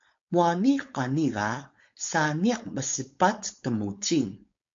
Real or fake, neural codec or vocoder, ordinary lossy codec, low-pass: fake; codec, 16 kHz, 4.8 kbps, FACodec; MP3, 48 kbps; 7.2 kHz